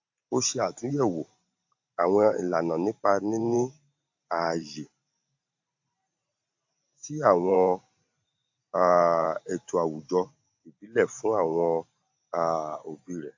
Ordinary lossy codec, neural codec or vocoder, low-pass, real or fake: AAC, 48 kbps; vocoder, 44.1 kHz, 128 mel bands every 512 samples, BigVGAN v2; 7.2 kHz; fake